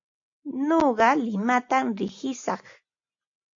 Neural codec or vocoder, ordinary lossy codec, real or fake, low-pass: none; AAC, 48 kbps; real; 7.2 kHz